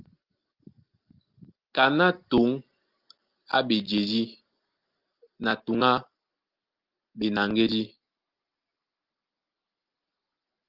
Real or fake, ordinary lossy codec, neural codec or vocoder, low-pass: real; Opus, 24 kbps; none; 5.4 kHz